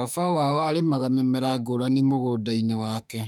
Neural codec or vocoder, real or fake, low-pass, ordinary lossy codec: autoencoder, 48 kHz, 32 numbers a frame, DAC-VAE, trained on Japanese speech; fake; 19.8 kHz; none